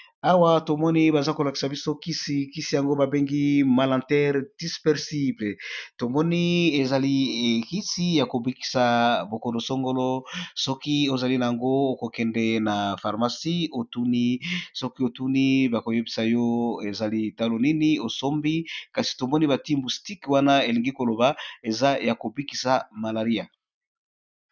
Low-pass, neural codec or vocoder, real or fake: 7.2 kHz; none; real